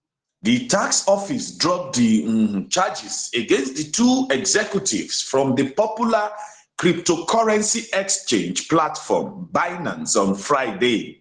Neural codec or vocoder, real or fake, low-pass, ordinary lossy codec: none; real; 9.9 kHz; Opus, 16 kbps